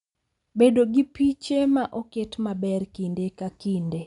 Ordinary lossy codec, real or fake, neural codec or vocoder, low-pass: none; real; none; 10.8 kHz